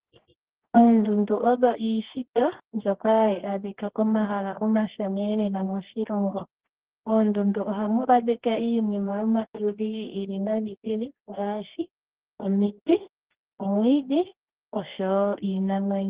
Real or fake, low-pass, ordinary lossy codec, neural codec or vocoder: fake; 3.6 kHz; Opus, 16 kbps; codec, 24 kHz, 0.9 kbps, WavTokenizer, medium music audio release